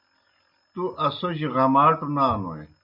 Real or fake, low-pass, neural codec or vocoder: real; 5.4 kHz; none